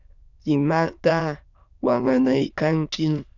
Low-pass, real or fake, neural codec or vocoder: 7.2 kHz; fake; autoencoder, 22.05 kHz, a latent of 192 numbers a frame, VITS, trained on many speakers